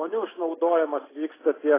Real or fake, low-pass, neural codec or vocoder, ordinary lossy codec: real; 3.6 kHz; none; AAC, 16 kbps